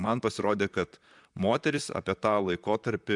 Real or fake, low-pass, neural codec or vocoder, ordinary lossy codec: fake; 10.8 kHz; vocoder, 44.1 kHz, 128 mel bands, Pupu-Vocoder; MP3, 96 kbps